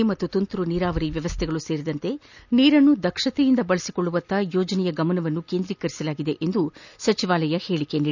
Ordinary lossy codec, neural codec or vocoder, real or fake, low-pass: none; none; real; 7.2 kHz